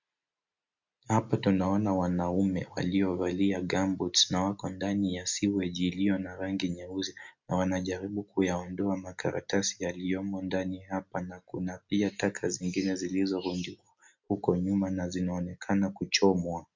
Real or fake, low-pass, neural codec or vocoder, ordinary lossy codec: real; 7.2 kHz; none; MP3, 64 kbps